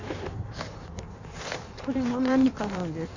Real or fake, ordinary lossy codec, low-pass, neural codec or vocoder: fake; none; 7.2 kHz; codec, 16 kHz in and 24 kHz out, 1.1 kbps, FireRedTTS-2 codec